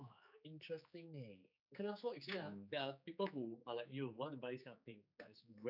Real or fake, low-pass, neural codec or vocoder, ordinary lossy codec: fake; 5.4 kHz; codec, 16 kHz, 4 kbps, X-Codec, HuBERT features, trained on general audio; none